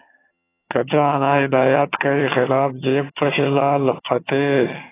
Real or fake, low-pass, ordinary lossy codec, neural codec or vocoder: fake; 3.6 kHz; AAC, 16 kbps; vocoder, 22.05 kHz, 80 mel bands, HiFi-GAN